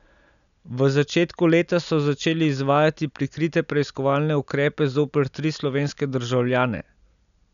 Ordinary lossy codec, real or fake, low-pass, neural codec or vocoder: none; real; 7.2 kHz; none